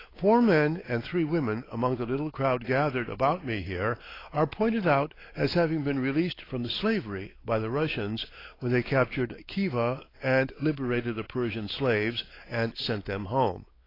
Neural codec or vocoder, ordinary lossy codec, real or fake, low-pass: codec, 16 kHz, 4 kbps, X-Codec, WavLM features, trained on Multilingual LibriSpeech; AAC, 24 kbps; fake; 5.4 kHz